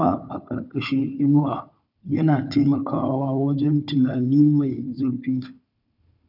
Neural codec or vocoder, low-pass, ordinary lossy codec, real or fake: codec, 16 kHz, 4 kbps, FunCodec, trained on Chinese and English, 50 frames a second; 5.4 kHz; none; fake